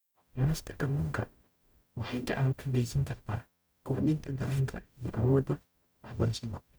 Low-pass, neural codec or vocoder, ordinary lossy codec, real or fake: none; codec, 44.1 kHz, 0.9 kbps, DAC; none; fake